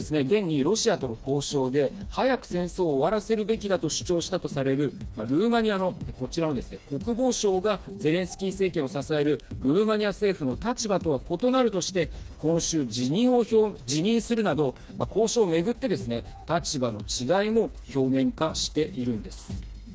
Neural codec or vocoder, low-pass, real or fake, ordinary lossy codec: codec, 16 kHz, 2 kbps, FreqCodec, smaller model; none; fake; none